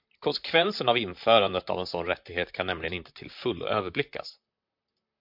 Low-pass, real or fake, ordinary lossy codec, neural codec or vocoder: 5.4 kHz; fake; MP3, 48 kbps; vocoder, 44.1 kHz, 128 mel bands, Pupu-Vocoder